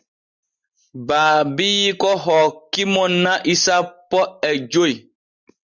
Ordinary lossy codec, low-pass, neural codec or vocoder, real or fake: Opus, 64 kbps; 7.2 kHz; none; real